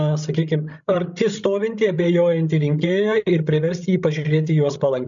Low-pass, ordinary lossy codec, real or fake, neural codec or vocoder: 7.2 kHz; MP3, 96 kbps; fake; codec, 16 kHz, 16 kbps, FreqCodec, larger model